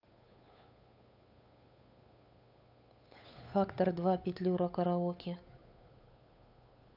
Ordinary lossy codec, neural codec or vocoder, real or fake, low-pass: none; codec, 16 kHz, 8 kbps, FunCodec, trained on Chinese and English, 25 frames a second; fake; 5.4 kHz